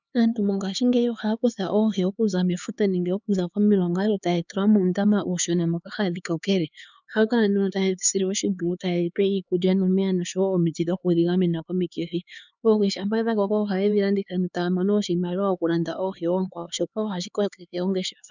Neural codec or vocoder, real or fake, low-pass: codec, 16 kHz, 4 kbps, X-Codec, HuBERT features, trained on LibriSpeech; fake; 7.2 kHz